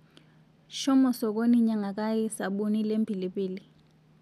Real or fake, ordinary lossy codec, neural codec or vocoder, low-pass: real; none; none; 14.4 kHz